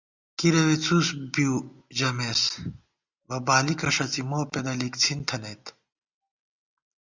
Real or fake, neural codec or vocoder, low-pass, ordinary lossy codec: real; none; 7.2 kHz; Opus, 64 kbps